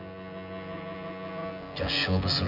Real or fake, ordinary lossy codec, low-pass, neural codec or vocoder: fake; none; 5.4 kHz; vocoder, 24 kHz, 100 mel bands, Vocos